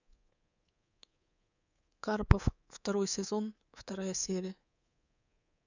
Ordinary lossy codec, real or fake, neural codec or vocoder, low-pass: none; fake; codec, 24 kHz, 3.1 kbps, DualCodec; 7.2 kHz